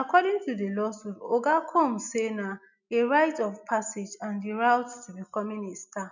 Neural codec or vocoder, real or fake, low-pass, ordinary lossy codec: none; real; 7.2 kHz; none